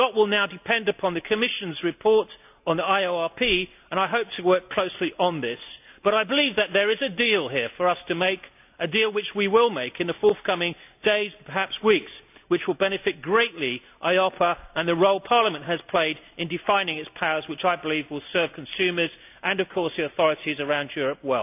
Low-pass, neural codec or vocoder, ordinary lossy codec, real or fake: 3.6 kHz; none; AAC, 32 kbps; real